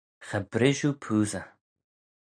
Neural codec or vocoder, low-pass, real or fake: none; 9.9 kHz; real